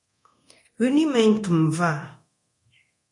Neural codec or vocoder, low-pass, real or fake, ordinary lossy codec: codec, 24 kHz, 0.9 kbps, DualCodec; 10.8 kHz; fake; MP3, 48 kbps